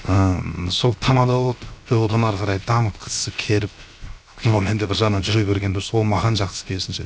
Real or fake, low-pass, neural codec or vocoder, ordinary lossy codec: fake; none; codec, 16 kHz, 0.7 kbps, FocalCodec; none